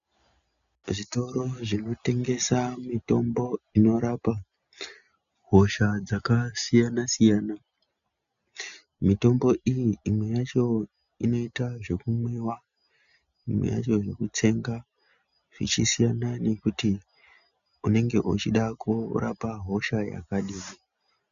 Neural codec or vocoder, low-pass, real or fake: none; 7.2 kHz; real